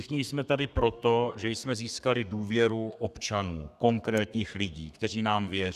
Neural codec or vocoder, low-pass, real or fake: codec, 32 kHz, 1.9 kbps, SNAC; 14.4 kHz; fake